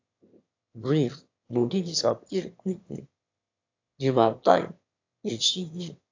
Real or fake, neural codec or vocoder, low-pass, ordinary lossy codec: fake; autoencoder, 22.05 kHz, a latent of 192 numbers a frame, VITS, trained on one speaker; 7.2 kHz; AAC, 48 kbps